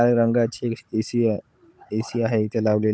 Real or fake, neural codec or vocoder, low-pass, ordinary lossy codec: fake; codec, 16 kHz, 16 kbps, FunCodec, trained on Chinese and English, 50 frames a second; none; none